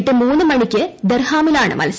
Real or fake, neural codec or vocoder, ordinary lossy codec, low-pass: real; none; none; none